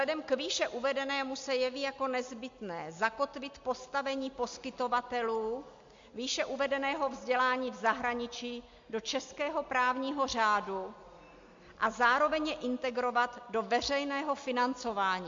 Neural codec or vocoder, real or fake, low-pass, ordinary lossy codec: none; real; 7.2 kHz; MP3, 48 kbps